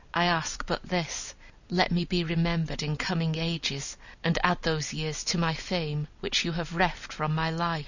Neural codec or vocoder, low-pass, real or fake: none; 7.2 kHz; real